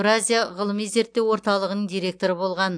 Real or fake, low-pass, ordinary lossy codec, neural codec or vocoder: real; 9.9 kHz; AAC, 64 kbps; none